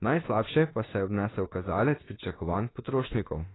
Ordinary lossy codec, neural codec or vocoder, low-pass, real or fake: AAC, 16 kbps; autoencoder, 48 kHz, 32 numbers a frame, DAC-VAE, trained on Japanese speech; 7.2 kHz; fake